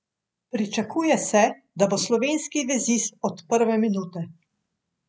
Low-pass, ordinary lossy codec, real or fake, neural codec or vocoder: none; none; real; none